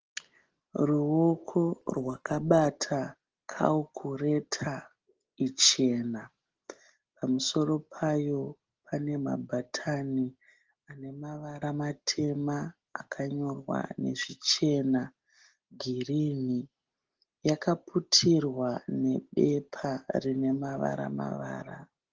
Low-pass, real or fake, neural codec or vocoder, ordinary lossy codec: 7.2 kHz; real; none; Opus, 16 kbps